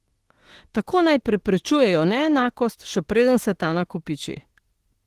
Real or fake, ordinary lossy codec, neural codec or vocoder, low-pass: fake; Opus, 16 kbps; autoencoder, 48 kHz, 32 numbers a frame, DAC-VAE, trained on Japanese speech; 14.4 kHz